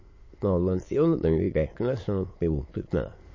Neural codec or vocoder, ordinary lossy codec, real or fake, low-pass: autoencoder, 22.05 kHz, a latent of 192 numbers a frame, VITS, trained on many speakers; MP3, 32 kbps; fake; 7.2 kHz